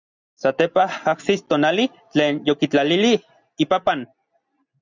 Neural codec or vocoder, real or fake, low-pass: none; real; 7.2 kHz